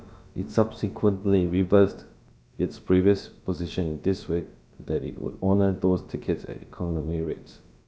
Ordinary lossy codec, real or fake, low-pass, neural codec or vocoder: none; fake; none; codec, 16 kHz, about 1 kbps, DyCAST, with the encoder's durations